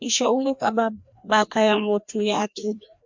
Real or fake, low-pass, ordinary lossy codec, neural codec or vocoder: fake; 7.2 kHz; MP3, 64 kbps; codec, 16 kHz, 1 kbps, FreqCodec, larger model